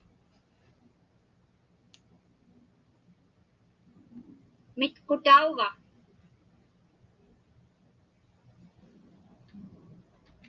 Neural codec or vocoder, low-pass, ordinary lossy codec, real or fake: none; 7.2 kHz; Opus, 24 kbps; real